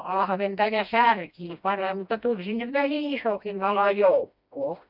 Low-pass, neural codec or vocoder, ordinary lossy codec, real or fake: 5.4 kHz; codec, 16 kHz, 1 kbps, FreqCodec, smaller model; none; fake